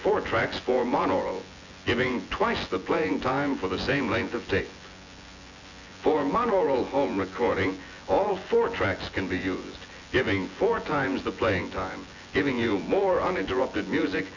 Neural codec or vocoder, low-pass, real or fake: vocoder, 24 kHz, 100 mel bands, Vocos; 7.2 kHz; fake